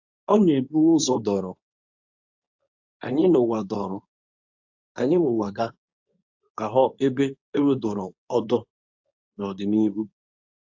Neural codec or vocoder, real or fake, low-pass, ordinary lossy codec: codec, 24 kHz, 0.9 kbps, WavTokenizer, medium speech release version 2; fake; 7.2 kHz; AAC, 48 kbps